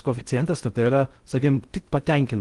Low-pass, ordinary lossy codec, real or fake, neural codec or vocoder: 10.8 kHz; Opus, 24 kbps; fake; codec, 16 kHz in and 24 kHz out, 0.6 kbps, FocalCodec, streaming, 4096 codes